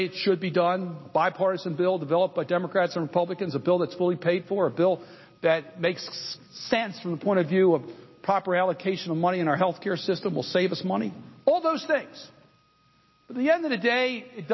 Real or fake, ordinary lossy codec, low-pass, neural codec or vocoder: real; MP3, 24 kbps; 7.2 kHz; none